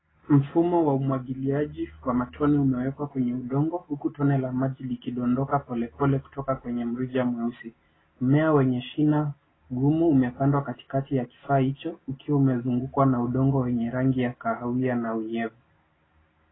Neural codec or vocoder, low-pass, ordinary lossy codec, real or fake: none; 7.2 kHz; AAC, 16 kbps; real